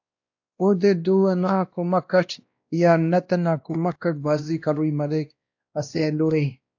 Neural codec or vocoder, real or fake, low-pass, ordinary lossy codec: codec, 16 kHz, 1 kbps, X-Codec, WavLM features, trained on Multilingual LibriSpeech; fake; 7.2 kHz; AAC, 48 kbps